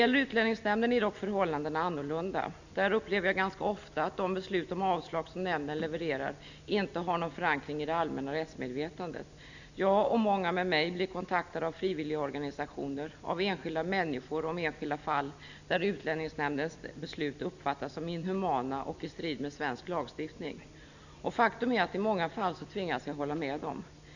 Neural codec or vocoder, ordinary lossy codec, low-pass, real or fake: none; none; 7.2 kHz; real